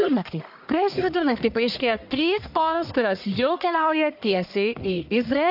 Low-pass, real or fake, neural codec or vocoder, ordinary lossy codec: 5.4 kHz; fake; codec, 44.1 kHz, 1.7 kbps, Pupu-Codec; AAC, 48 kbps